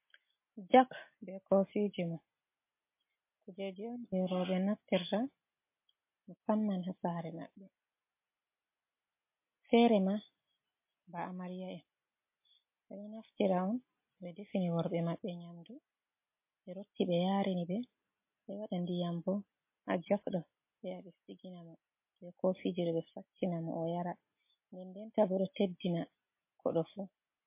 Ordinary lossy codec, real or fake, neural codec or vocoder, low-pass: MP3, 24 kbps; real; none; 3.6 kHz